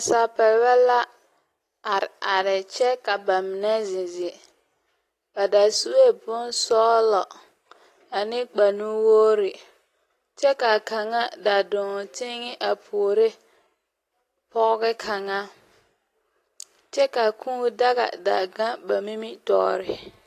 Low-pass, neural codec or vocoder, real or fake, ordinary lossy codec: 14.4 kHz; none; real; AAC, 48 kbps